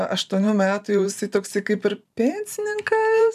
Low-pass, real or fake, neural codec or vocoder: 14.4 kHz; fake; vocoder, 44.1 kHz, 128 mel bands every 512 samples, BigVGAN v2